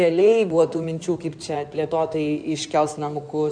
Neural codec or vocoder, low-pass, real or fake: codec, 16 kHz in and 24 kHz out, 2.2 kbps, FireRedTTS-2 codec; 9.9 kHz; fake